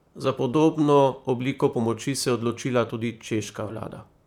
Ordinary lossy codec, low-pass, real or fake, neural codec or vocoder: none; 19.8 kHz; fake; vocoder, 44.1 kHz, 128 mel bands, Pupu-Vocoder